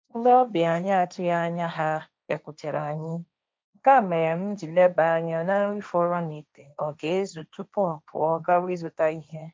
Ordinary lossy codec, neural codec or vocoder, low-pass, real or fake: none; codec, 16 kHz, 1.1 kbps, Voila-Tokenizer; none; fake